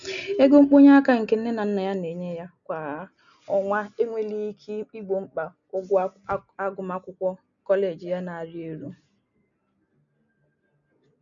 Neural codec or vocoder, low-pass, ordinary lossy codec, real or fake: none; 7.2 kHz; none; real